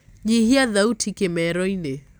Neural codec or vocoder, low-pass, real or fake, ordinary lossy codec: none; none; real; none